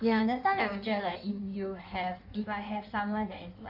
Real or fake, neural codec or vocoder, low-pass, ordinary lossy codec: fake; codec, 16 kHz in and 24 kHz out, 1.1 kbps, FireRedTTS-2 codec; 5.4 kHz; none